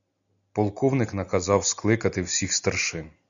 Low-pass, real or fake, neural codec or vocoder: 7.2 kHz; real; none